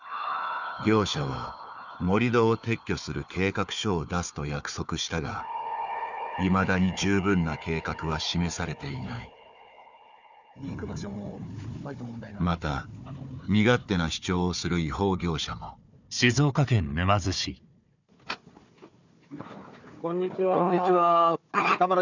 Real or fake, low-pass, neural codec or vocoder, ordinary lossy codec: fake; 7.2 kHz; codec, 16 kHz, 4 kbps, FunCodec, trained on Chinese and English, 50 frames a second; none